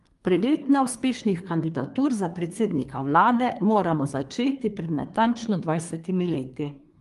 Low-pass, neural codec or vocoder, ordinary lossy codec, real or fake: 10.8 kHz; codec, 24 kHz, 1 kbps, SNAC; Opus, 32 kbps; fake